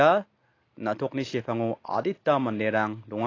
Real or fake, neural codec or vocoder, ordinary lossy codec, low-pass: real; none; AAC, 32 kbps; 7.2 kHz